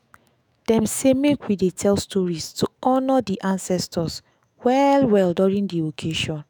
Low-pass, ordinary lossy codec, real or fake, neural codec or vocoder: none; none; fake; autoencoder, 48 kHz, 128 numbers a frame, DAC-VAE, trained on Japanese speech